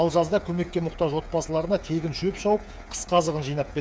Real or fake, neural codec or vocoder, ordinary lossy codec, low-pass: fake; codec, 16 kHz, 8 kbps, FreqCodec, smaller model; none; none